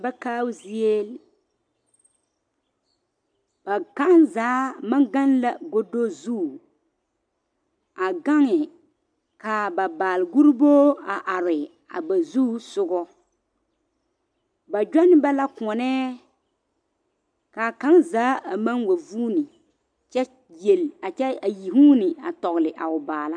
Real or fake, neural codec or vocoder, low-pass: real; none; 9.9 kHz